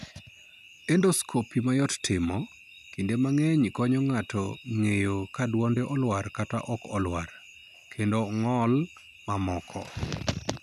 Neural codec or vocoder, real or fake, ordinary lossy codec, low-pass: none; real; none; 14.4 kHz